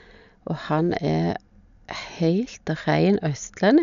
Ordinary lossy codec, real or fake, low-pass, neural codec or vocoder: none; real; 7.2 kHz; none